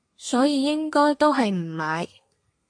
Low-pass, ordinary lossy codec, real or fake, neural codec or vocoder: 9.9 kHz; MP3, 48 kbps; fake; codec, 32 kHz, 1.9 kbps, SNAC